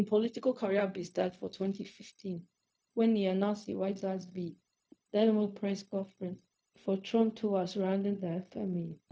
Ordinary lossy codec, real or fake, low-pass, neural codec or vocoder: none; fake; none; codec, 16 kHz, 0.4 kbps, LongCat-Audio-Codec